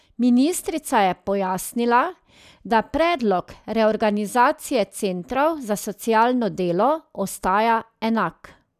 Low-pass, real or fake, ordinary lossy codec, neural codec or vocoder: 14.4 kHz; real; none; none